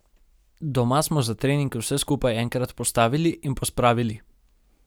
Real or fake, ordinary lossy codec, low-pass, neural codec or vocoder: real; none; none; none